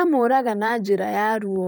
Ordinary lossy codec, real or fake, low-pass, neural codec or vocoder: none; fake; none; vocoder, 44.1 kHz, 128 mel bands, Pupu-Vocoder